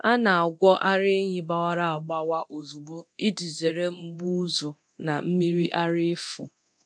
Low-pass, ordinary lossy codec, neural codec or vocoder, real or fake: 9.9 kHz; AAC, 64 kbps; codec, 24 kHz, 0.9 kbps, DualCodec; fake